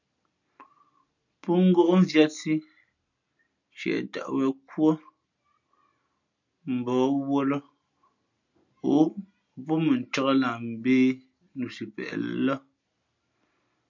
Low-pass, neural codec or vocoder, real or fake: 7.2 kHz; none; real